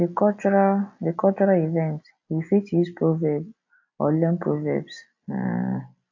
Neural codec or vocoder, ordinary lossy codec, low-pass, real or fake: none; AAC, 48 kbps; 7.2 kHz; real